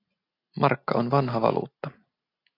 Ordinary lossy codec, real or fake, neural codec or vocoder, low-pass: AAC, 24 kbps; real; none; 5.4 kHz